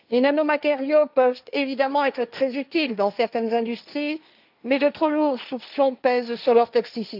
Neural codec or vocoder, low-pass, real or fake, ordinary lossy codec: codec, 16 kHz, 1.1 kbps, Voila-Tokenizer; 5.4 kHz; fake; none